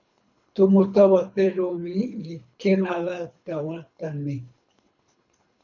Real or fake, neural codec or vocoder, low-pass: fake; codec, 24 kHz, 3 kbps, HILCodec; 7.2 kHz